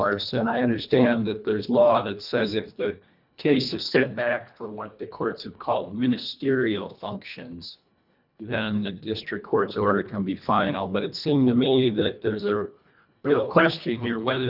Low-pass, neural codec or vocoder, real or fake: 5.4 kHz; codec, 24 kHz, 1.5 kbps, HILCodec; fake